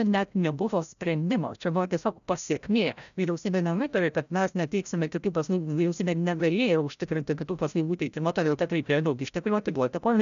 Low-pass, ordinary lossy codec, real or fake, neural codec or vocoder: 7.2 kHz; MP3, 96 kbps; fake; codec, 16 kHz, 0.5 kbps, FreqCodec, larger model